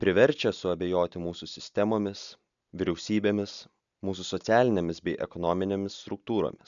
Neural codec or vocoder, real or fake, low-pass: none; real; 7.2 kHz